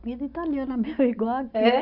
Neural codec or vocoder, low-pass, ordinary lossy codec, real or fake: none; 5.4 kHz; none; real